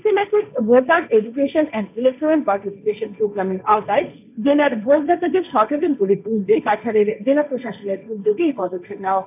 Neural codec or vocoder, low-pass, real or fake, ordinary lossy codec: codec, 16 kHz, 1.1 kbps, Voila-Tokenizer; 3.6 kHz; fake; none